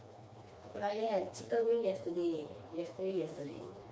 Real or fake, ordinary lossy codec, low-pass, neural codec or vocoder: fake; none; none; codec, 16 kHz, 2 kbps, FreqCodec, smaller model